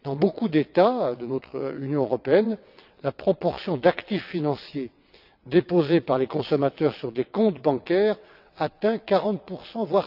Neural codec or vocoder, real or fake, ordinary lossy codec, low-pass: vocoder, 22.05 kHz, 80 mel bands, WaveNeXt; fake; none; 5.4 kHz